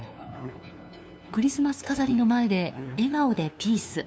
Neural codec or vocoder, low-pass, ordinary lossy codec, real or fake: codec, 16 kHz, 2 kbps, FunCodec, trained on LibriTTS, 25 frames a second; none; none; fake